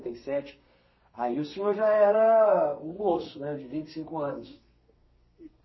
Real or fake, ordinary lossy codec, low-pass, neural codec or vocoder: fake; MP3, 24 kbps; 7.2 kHz; codec, 32 kHz, 1.9 kbps, SNAC